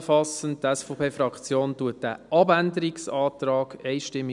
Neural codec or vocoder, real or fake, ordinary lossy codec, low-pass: none; real; none; 10.8 kHz